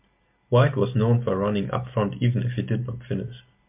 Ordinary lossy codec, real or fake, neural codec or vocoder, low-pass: MP3, 32 kbps; real; none; 3.6 kHz